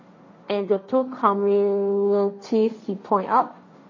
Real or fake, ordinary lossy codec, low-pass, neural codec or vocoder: fake; MP3, 32 kbps; 7.2 kHz; codec, 16 kHz, 1.1 kbps, Voila-Tokenizer